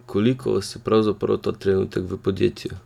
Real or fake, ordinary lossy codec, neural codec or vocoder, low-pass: real; none; none; 19.8 kHz